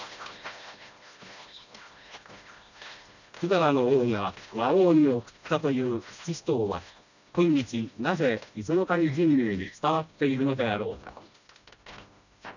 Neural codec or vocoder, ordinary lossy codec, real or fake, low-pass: codec, 16 kHz, 1 kbps, FreqCodec, smaller model; none; fake; 7.2 kHz